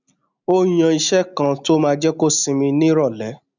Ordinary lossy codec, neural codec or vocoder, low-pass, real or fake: none; none; 7.2 kHz; real